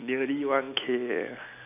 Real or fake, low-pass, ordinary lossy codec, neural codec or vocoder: real; 3.6 kHz; none; none